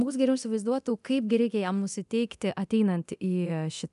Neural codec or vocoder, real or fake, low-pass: codec, 24 kHz, 0.9 kbps, DualCodec; fake; 10.8 kHz